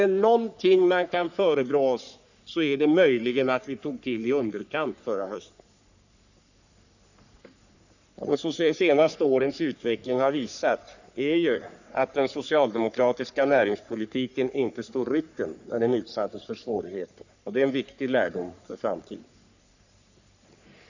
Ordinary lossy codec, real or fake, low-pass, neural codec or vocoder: none; fake; 7.2 kHz; codec, 44.1 kHz, 3.4 kbps, Pupu-Codec